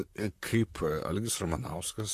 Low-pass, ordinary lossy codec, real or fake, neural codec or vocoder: 14.4 kHz; AAC, 64 kbps; fake; vocoder, 44.1 kHz, 128 mel bands, Pupu-Vocoder